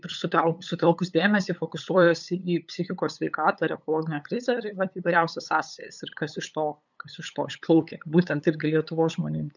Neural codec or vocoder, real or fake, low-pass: codec, 16 kHz, 8 kbps, FunCodec, trained on LibriTTS, 25 frames a second; fake; 7.2 kHz